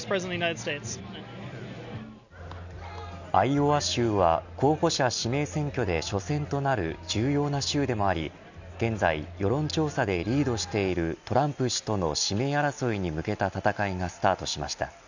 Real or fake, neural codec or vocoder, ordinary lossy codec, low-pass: real; none; none; 7.2 kHz